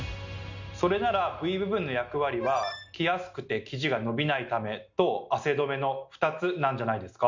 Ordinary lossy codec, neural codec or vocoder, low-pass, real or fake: Opus, 64 kbps; none; 7.2 kHz; real